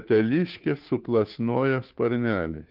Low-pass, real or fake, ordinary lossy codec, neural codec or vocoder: 5.4 kHz; fake; Opus, 24 kbps; codec, 16 kHz, 2 kbps, FunCodec, trained on Chinese and English, 25 frames a second